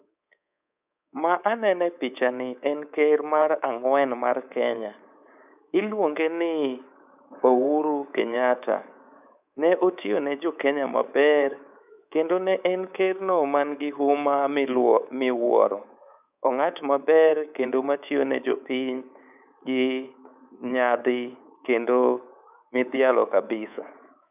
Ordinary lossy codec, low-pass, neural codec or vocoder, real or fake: none; 3.6 kHz; codec, 24 kHz, 3.1 kbps, DualCodec; fake